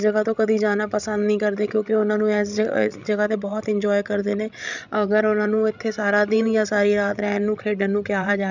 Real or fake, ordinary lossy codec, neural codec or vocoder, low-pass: fake; none; codec, 16 kHz, 16 kbps, FreqCodec, larger model; 7.2 kHz